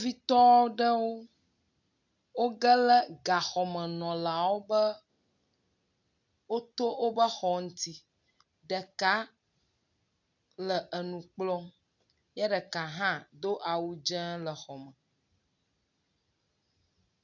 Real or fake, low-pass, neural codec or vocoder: real; 7.2 kHz; none